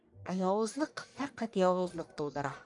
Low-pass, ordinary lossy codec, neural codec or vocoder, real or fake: 10.8 kHz; MP3, 96 kbps; codec, 44.1 kHz, 1.7 kbps, Pupu-Codec; fake